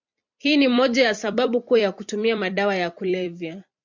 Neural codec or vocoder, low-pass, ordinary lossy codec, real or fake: vocoder, 44.1 kHz, 128 mel bands every 256 samples, BigVGAN v2; 7.2 kHz; MP3, 64 kbps; fake